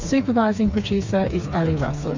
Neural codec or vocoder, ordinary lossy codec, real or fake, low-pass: codec, 16 kHz, 4 kbps, FreqCodec, smaller model; MP3, 48 kbps; fake; 7.2 kHz